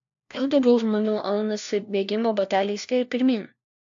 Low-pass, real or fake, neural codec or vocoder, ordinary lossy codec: 7.2 kHz; fake; codec, 16 kHz, 1 kbps, FunCodec, trained on LibriTTS, 50 frames a second; AAC, 64 kbps